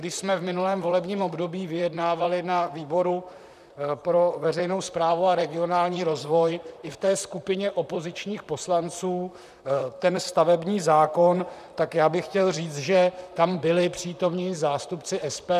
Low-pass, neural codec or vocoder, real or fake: 14.4 kHz; vocoder, 44.1 kHz, 128 mel bands, Pupu-Vocoder; fake